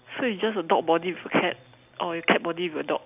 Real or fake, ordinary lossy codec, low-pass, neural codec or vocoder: real; none; 3.6 kHz; none